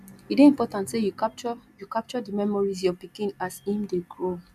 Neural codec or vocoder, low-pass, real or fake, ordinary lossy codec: none; 14.4 kHz; real; none